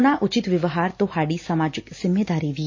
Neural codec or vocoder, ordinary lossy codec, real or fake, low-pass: none; MP3, 32 kbps; real; 7.2 kHz